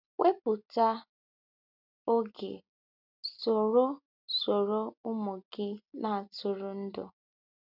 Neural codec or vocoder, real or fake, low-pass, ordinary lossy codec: none; real; 5.4 kHz; none